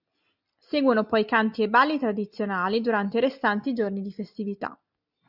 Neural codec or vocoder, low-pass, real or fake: none; 5.4 kHz; real